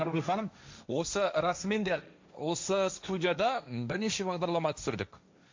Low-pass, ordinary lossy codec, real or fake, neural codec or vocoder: 7.2 kHz; MP3, 64 kbps; fake; codec, 16 kHz, 1.1 kbps, Voila-Tokenizer